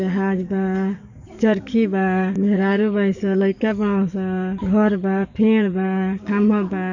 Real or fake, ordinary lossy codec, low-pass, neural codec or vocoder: fake; none; 7.2 kHz; codec, 44.1 kHz, 7.8 kbps, DAC